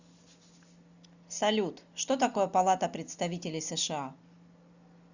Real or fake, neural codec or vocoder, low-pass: real; none; 7.2 kHz